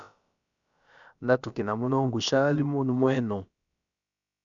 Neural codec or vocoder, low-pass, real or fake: codec, 16 kHz, about 1 kbps, DyCAST, with the encoder's durations; 7.2 kHz; fake